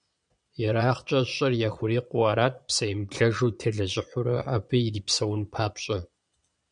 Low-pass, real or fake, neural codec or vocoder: 9.9 kHz; fake; vocoder, 22.05 kHz, 80 mel bands, Vocos